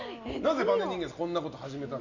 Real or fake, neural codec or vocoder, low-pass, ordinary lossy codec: real; none; 7.2 kHz; none